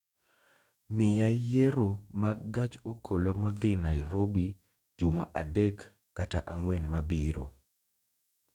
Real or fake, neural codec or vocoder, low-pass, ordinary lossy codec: fake; codec, 44.1 kHz, 2.6 kbps, DAC; 19.8 kHz; none